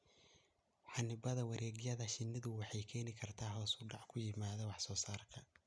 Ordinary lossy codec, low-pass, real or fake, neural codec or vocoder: none; none; real; none